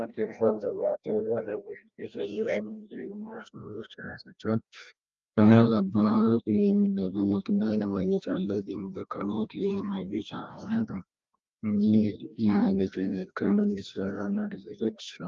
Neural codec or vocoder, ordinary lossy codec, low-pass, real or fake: codec, 16 kHz, 1 kbps, FreqCodec, larger model; Opus, 32 kbps; 7.2 kHz; fake